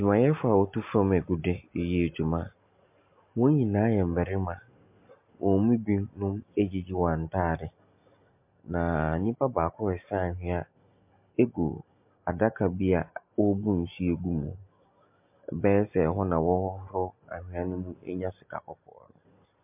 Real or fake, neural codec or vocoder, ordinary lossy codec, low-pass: real; none; MP3, 32 kbps; 3.6 kHz